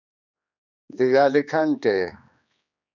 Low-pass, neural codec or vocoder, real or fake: 7.2 kHz; codec, 16 kHz, 2 kbps, X-Codec, HuBERT features, trained on general audio; fake